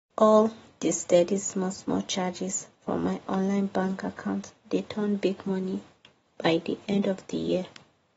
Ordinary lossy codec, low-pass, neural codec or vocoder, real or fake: AAC, 24 kbps; 19.8 kHz; none; real